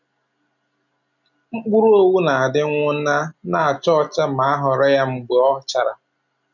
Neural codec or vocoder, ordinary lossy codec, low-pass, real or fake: none; none; 7.2 kHz; real